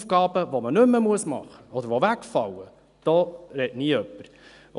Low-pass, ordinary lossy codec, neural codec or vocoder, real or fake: 10.8 kHz; none; none; real